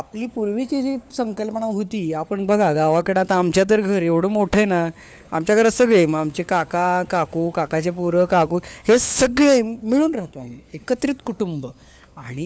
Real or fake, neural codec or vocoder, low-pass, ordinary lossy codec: fake; codec, 16 kHz, 4 kbps, FunCodec, trained on LibriTTS, 50 frames a second; none; none